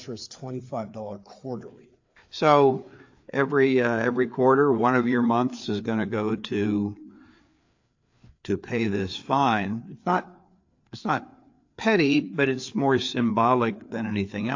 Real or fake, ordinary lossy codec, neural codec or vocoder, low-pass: fake; AAC, 48 kbps; codec, 16 kHz, 4 kbps, FreqCodec, larger model; 7.2 kHz